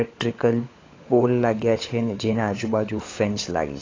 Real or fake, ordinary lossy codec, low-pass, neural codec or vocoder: fake; AAC, 32 kbps; 7.2 kHz; codec, 16 kHz, 16 kbps, FunCodec, trained on LibriTTS, 50 frames a second